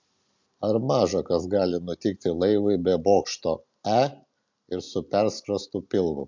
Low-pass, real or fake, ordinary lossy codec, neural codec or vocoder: 7.2 kHz; real; MP3, 64 kbps; none